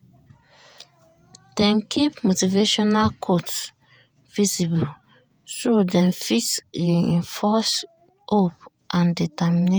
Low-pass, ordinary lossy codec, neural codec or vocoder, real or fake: none; none; vocoder, 48 kHz, 128 mel bands, Vocos; fake